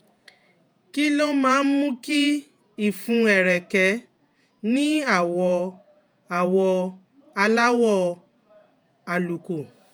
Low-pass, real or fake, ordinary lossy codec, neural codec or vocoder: none; fake; none; vocoder, 48 kHz, 128 mel bands, Vocos